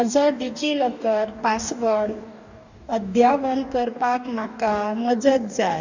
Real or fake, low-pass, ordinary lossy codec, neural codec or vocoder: fake; 7.2 kHz; none; codec, 44.1 kHz, 2.6 kbps, DAC